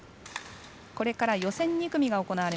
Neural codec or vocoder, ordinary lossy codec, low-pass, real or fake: none; none; none; real